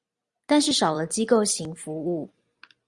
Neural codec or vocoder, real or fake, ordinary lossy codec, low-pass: vocoder, 44.1 kHz, 128 mel bands every 256 samples, BigVGAN v2; fake; Opus, 64 kbps; 10.8 kHz